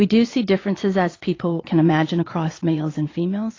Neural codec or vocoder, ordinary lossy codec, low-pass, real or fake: vocoder, 22.05 kHz, 80 mel bands, Vocos; AAC, 32 kbps; 7.2 kHz; fake